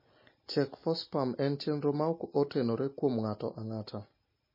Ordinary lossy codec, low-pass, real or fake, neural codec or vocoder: MP3, 24 kbps; 5.4 kHz; real; none